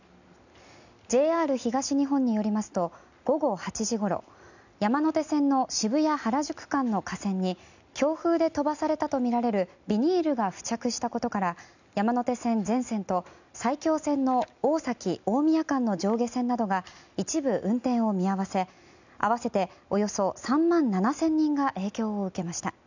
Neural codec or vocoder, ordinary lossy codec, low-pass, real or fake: none; none; 7.2 kHz; real